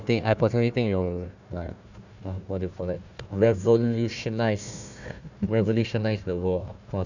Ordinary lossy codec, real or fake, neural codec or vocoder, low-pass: none; fake; codec, 16 kHz, 1 kbps, FunCodec, trained on Chinese and English, 50 frames a second; 7.2 kHz